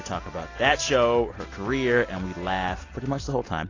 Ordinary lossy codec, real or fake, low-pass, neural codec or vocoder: AAC, 32 kbps; real; 7.2 kHz; none